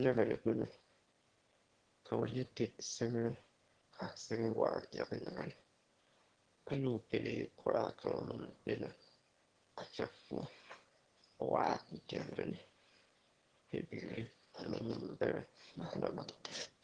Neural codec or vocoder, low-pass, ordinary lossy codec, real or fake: autoencoder, 22.05 kHz, a latent of 192 numbers a frame, VITS, trained on one speaker; 9.9 kHz; Opus, 16 kbps; fake